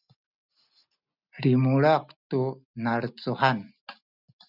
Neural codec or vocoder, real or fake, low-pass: none; real; 5.4 kHz